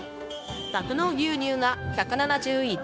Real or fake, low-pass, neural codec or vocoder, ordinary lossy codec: fake; none; codec, 16 kHz, 0.9 kbps, LongCat-Audio-Codec; none